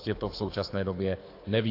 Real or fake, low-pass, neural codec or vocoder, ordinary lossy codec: fake; 5.4 kHz; codec, 24 kHz, 6 kbps, HILCodec; AAC, 32 kbps